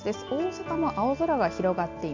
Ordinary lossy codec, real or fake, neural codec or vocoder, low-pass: MP3, 48 kbps; real; none; 7.2 kHz